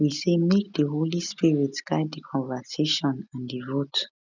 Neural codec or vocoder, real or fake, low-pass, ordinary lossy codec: none; real; 7.2 kHz; none